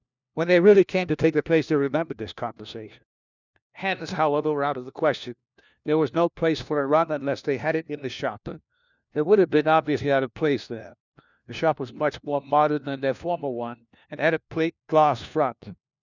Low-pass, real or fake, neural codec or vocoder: 7.2 kHz; fake; codec, 16 kHz, 1 kbps, FunCodec, trained on LibriTTS, 50 frames a second